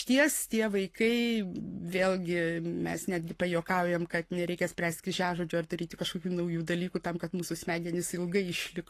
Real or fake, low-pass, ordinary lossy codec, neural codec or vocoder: fake; 14.4 kHz; AAC, 48 kbps; codec, 44.1 kHz, 7.8 kbps, Pupu-Codec